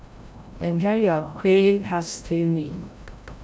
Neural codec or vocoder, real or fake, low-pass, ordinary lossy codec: codec, 16 kHz, 0.5 kbps, FreqCodec, larger model; fake; none; none